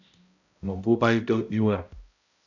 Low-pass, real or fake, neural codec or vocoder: 7.2 kHz; fake; codec, 16 kHz, 0.5 kbps, X-Codec, HuBERT features, trained on balanced general audio